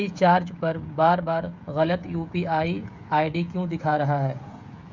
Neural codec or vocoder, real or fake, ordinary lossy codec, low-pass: codec, 16 kHz, 16 kbps, FreqCodec, smaller model; fake; Opus, 64 kbps; 7.2 kHz